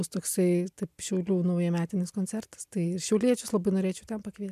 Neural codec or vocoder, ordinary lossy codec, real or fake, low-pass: none; MP3, 96 kbps; real; 14.4 kHz